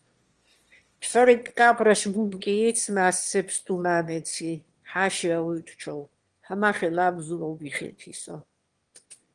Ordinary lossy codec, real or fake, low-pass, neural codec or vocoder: Opus, 24 kbps; fake; 9.9 kHz; autoencoder, 22.05 kHz, a latent of 192 numbers a frame, VITS, trained on one speaker